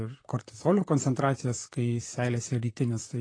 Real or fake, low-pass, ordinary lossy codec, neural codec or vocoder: fake; 9.9 kHz; AAC, 32 kbps; vocoder, 44.1 kHz, 128 mel bands, Pupu-Vocoder